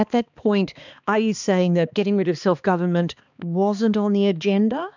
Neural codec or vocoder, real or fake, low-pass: codec, 16 kHz, 2 kbps, X-Codec, HuBERT features, trained on balanced general audio; fake; 7.2 kHz